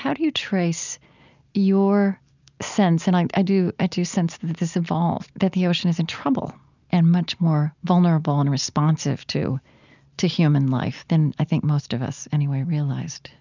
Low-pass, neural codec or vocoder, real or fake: 7.2 kHz; none; real